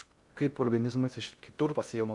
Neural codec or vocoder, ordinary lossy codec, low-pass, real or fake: codec, 16 kHz in and 24 kHz out, 0.6 kbps, FocalCodec, streaming, 2048 codes; Opus, 64 kbps; 10.8 kHz; fake